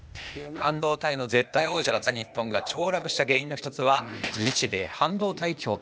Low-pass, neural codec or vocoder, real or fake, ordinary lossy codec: none; codec, 16 kHz, 0.8 kbps, ZipCodec; fake; none